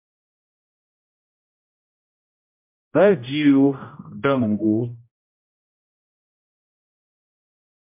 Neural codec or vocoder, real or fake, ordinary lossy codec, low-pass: codec, 16 kHz, 0.5 kbps, X-Codec, HuBERT features, trained on general audio; fake; MP3, 32 kbps; 3.6 kHz